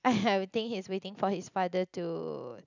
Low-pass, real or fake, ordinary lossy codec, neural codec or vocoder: 7.2 kHz; real; none; none